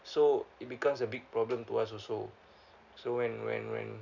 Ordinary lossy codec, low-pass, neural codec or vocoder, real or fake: none; 7.2 kHz; none; real